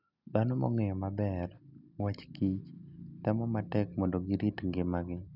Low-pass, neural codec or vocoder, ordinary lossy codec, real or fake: 5.4 kHz; none; none; real